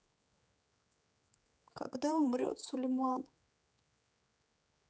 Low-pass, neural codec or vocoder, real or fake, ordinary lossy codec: none; codec, 16 kHz, 4 kbps, X-Codec, HuBERT features, trained on general audio; fake; none